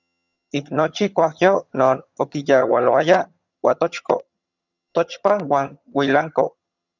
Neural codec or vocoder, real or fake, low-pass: vocoder, 22.05 kHz, 80 mel bands, HiFi-GAN; fake; 7.2 kHz